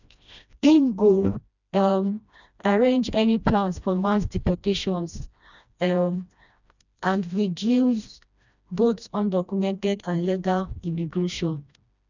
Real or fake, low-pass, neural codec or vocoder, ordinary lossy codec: fake; 7.2 kHz; codec, 16 kHz, 1 kbps, FreqCodec, smaller model; none